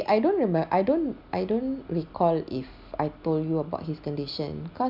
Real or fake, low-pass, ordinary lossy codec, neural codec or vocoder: real; 5.4 kHz; none; none